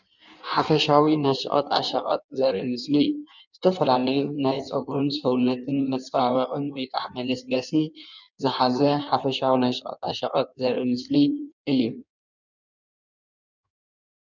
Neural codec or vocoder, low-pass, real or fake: codec, 16 kHz in and 24 kHz out, 1.1 kbps, FireRedTTS-2 codec; 7.2 kHz; fake